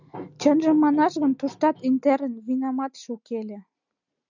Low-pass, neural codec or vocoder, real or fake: 7.2 kHz; none; real